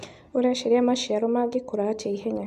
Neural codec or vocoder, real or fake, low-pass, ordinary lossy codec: none; real; 14.4 kHz; MP3, 96 kbps